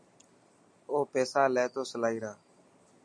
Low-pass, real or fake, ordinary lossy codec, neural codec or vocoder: 9.9 kHz; real; MP3, 48 kbps; none